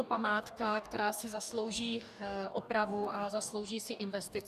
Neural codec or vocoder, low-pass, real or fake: codec, 44.1 kHz, 2.6 kbps, DAC; 14.4 kHz; fake